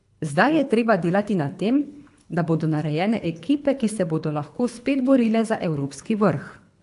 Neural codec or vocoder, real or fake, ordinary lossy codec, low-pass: codec, 24 kHz, 3 kbps, HILCodec; fake; AAC, 64 kbps; 10.8 kHz